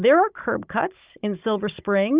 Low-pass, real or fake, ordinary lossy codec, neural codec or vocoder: 3.6 kHz; fake; Opus, 64 kbps; codec, 16 kHz, 16 kbps, FunCodec, trained on Chinese and English, 50 frames a second